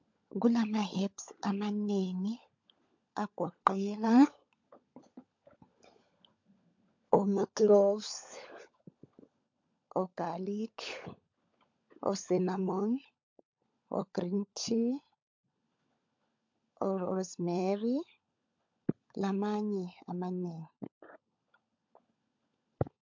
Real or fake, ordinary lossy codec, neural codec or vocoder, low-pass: fake; MP3, 48 kbps; codec, 16 kHz, 16 kbps, FunCodec, trained on LibriTTS, 50 frames a second; 7.2 kHz